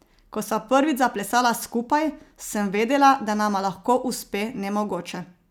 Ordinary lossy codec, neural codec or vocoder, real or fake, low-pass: none; none; real; none